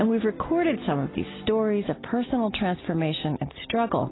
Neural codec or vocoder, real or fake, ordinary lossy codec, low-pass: none; real; AAC, 16 kbps; 7.2 kHz